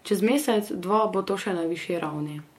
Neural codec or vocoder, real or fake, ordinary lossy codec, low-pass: vocoder, 48 kHz, 128 mel bands, Vocos; fake; MP3, 64 kbps; 19.8 kHz